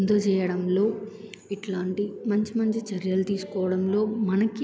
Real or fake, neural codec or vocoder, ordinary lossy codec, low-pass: real; none; none; none